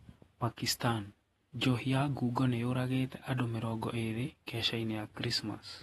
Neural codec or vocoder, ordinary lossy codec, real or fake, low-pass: vocoder, 48 kHz, 128 mel bands, Vocos; AAC, 32 kbps; fake; 19.8 kHz